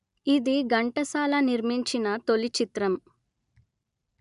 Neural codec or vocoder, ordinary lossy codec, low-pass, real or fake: none; none; 10.8 kHz; real